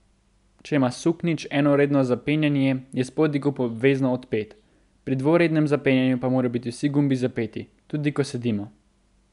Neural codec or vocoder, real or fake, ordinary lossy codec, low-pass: none; real; none; 10.8 kHz